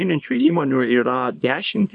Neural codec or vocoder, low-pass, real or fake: codec, 24 kHz, 0.9 kbps, WavTokenizer, small release; 10.8 kHz; fake